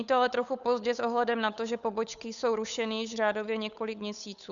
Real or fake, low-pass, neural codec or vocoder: fake; 7.2 kHz; codec, 16 kHz, 8 kbps, FunCodec, trained on Chinese and English, 25 frames a second